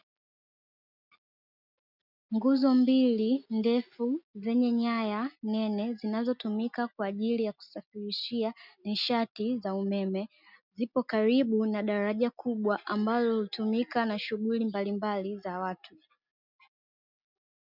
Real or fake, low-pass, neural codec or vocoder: real; 5.4 kHz; none